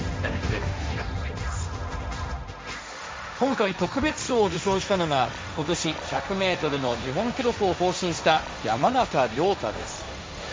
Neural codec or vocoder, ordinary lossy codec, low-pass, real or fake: codec, 16 kHz, 1.1 kbps, Voila-Tokenizer; none; none; fake